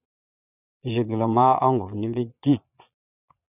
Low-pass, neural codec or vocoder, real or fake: 3.6 kHz; vocoder, 22.05 kHz, 80 mel bands, Vocos; fake